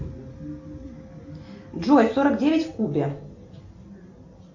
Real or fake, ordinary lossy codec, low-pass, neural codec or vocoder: real; AAC, 48 kbps; 7.2 kHz; none